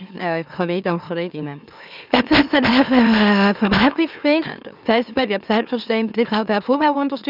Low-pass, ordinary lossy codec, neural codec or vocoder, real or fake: 5.4 kHz; none; autoencoder, 44.1 kHz, a latent of 192 numbers a frame, MeloTTS; fake